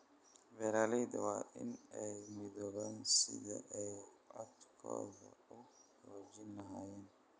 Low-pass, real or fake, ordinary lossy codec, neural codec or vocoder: none; real; none; none